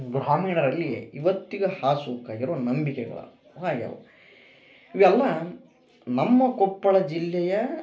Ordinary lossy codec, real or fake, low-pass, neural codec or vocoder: none; real; none; none